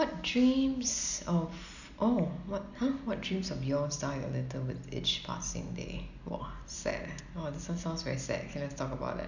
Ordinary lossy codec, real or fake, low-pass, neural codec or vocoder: none; real; 7.2 kHz; none